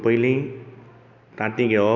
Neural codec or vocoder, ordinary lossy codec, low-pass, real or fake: none; none; 7.2 kHz; real